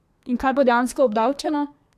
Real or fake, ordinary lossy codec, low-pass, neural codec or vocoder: fake; AAC, 96 kbps; 14.4 kHz; codec, 32 kHz, 1.9 kbps, SNAC